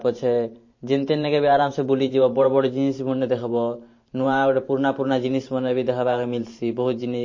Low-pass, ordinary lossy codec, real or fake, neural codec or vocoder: 7.2 kHz; MP3, 32 kbps; fake; vocoder, 44.1 kHz, 128 mel bands every 512 samples, BigVGAN v2